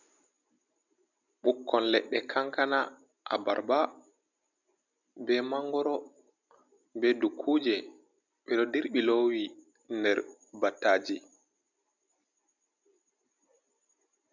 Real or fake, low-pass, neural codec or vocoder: real; 7.2 kHz; none